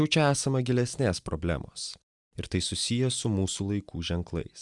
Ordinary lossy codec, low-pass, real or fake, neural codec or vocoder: Opus, 64 kbps; 10.8 kHz; real; none